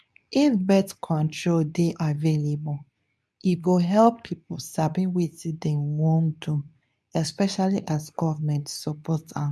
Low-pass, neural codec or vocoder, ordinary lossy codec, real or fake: none; codec, 24 kHz, 0.9 kbps, WavTokenizer, medium speech release version 2; none; fake